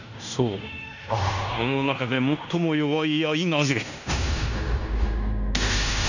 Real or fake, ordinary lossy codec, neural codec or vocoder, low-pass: fake; none; codec, 16 kHz in and 24 kHz out, 0.9 kbps, LongCat-Audio-Codec, fine tuned four codebook decoder; 7.2 kHz